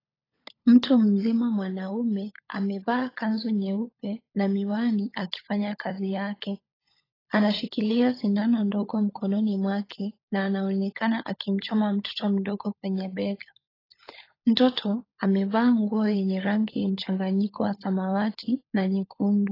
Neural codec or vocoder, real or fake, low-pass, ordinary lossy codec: codec, 16 kHz, 16 kbps, FunCodec, trained on LibriTTS, 50 frames a second; fake; 5.4 kHz; AAC, 24 kbps